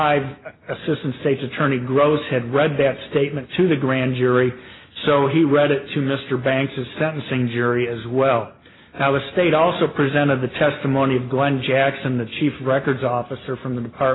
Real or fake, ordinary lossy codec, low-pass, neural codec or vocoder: real; AAC, 16 kbps; 7.2 kHz; none